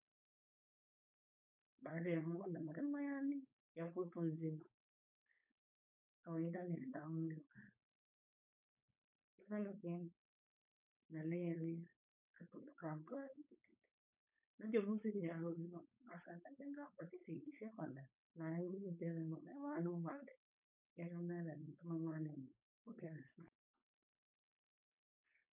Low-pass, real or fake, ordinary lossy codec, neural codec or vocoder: 3.6 kHz; fake; none; codec, 16 kHz, 4.8 kbps, FACodec